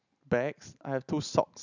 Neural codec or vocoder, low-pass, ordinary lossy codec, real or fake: none; 7.2 kHz; none; real